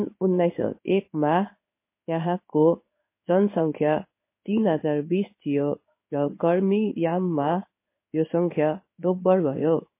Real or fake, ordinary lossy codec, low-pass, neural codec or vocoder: fake; MP3, 24 kbps; 3.6 kHz; codec, 16 kHz in and 24 kHz out, 1 kbps, XY-Tokenizer